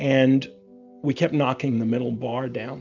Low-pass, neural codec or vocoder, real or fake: 7.2 kHz; none; real